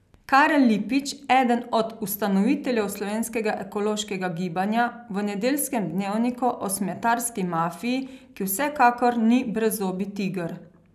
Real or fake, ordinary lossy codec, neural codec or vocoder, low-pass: real; none; none; 14.4 kHz